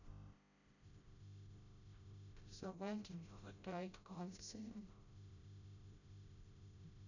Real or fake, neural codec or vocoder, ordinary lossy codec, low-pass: fake; codec, 16 kHz, 0.5 kbps, FreqCodec, smaller model; none; 7.2 kHz